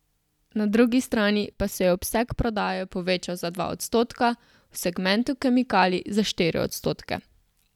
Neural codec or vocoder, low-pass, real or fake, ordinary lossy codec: none; 19.8 kHz; real; none